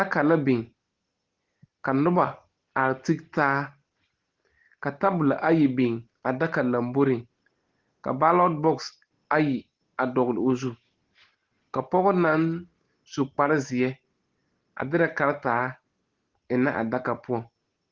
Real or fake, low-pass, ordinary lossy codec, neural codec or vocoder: real; 7.2 kHz; Opus, 16 kbps; none